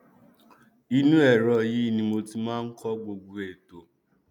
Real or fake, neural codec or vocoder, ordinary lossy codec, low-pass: real; none; none; 19.8 kHz